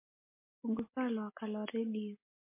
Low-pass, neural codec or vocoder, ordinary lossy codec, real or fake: 3.6 kHz; none; MP3, 24 kbps; real